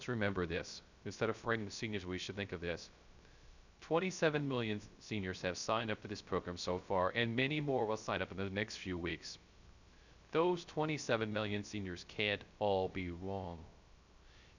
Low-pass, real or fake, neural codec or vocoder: 7.2 kHz; fake; codec, 16 kHz, 0.3 kbps, FocalCodec